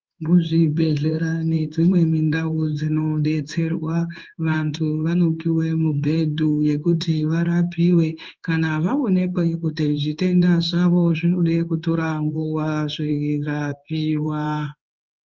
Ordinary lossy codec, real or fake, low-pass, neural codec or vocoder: Opus, 24 kbps; fake; 7.2 kHz; codec, 16 kHz in and 24 kHz out, 1 kbps, XY-Tokenizer